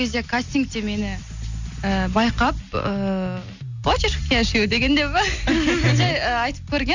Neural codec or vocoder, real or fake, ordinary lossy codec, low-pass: none; real; Opus, 64 kbps; 7.2 kHz